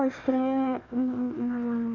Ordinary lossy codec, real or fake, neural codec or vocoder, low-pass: none; fake; codec, 16 kHz, 1 kbps, FunCodec, trained on Chinese and English, 50 frames a second; 7.2 kHz